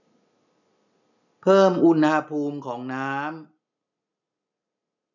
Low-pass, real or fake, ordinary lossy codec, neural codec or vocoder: 7.2 kHz; real; none; none